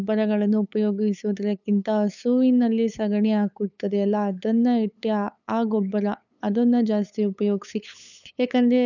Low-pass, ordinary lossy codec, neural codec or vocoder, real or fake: 7.2 kHz; none; codec, 16 kHz, 8 kbps, FunCodec, trained on LibriTTS, 25 frames a second; fake